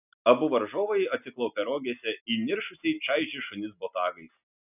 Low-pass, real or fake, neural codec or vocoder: 3.6 kHz; real; none